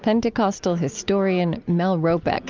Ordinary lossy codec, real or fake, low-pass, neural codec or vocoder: Opus, 24 kbps; real; 7.2 kHz; none